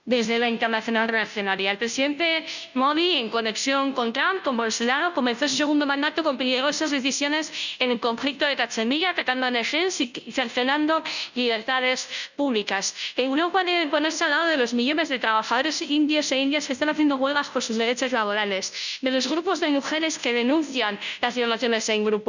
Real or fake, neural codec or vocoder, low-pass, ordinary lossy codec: fake; codec, 16 kHz, 0.5 kbps, FunCodec, trained on Chinese and English, 25 frames a second; 7.2 kHz; none